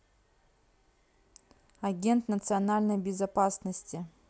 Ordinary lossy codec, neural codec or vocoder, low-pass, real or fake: none; none; none; real